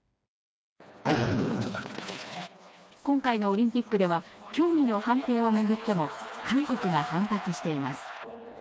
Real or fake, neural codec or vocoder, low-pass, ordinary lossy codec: fake; codec, 16 kHz, 2 kbps, FreqCodec, smaller model; none; none